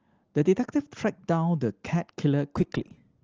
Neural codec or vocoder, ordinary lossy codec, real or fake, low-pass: none; Opus, 16 kbps; real; 7.2 kHz